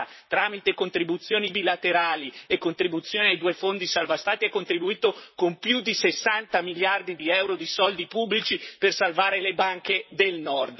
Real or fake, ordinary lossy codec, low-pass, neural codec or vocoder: fake; MP3, 24 kbps; 7.2 kHz; vocoder, 44.1 kHz, 128 mel bands, Pupu-Vocoder